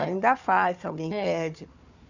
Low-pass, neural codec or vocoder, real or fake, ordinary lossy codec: 7.2 kHz; codec, 16 kHz, 4 kbps, FunCodec, trained on Chinese and English, 50 frames a second; fake; none